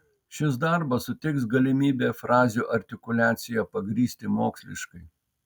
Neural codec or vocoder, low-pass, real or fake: none; 19.8 kHz; real